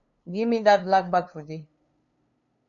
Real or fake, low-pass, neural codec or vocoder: fake; 7.2 kHz; codec, 16 kHz, 2 kbps, FunCodec, trained on LibriTTS, 25 frames a second